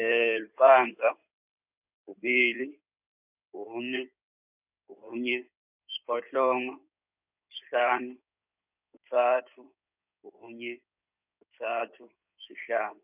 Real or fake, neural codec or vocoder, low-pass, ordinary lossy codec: fake; codec, 16 kHz in and 24 kHz out, 2.2 kbps, FireRedTTS-2 codec; 3.6 kHz; none